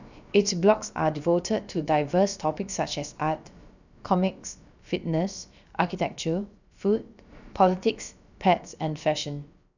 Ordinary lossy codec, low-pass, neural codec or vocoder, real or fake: none; 7.2 kHz; codec, 16 kHz, about 1 kbps, DyCAST, with the encoder's durations; fake